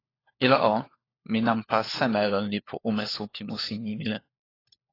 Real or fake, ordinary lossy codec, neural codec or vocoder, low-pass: fake; AAC, 24 kbps; codec, 16 kHz, 4 kbps, FunCodec, trained on LibriTTS, 50 frames a second; 5.4 kHz